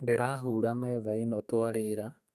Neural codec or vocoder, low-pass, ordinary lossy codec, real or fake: codec, 32 kHz, 1.9 kbps, SNAC; 14.4 kHz; none; fake